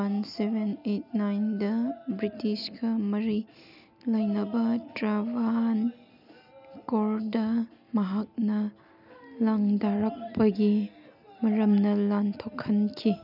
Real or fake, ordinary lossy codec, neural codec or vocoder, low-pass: real; none; none; 5.4 kHz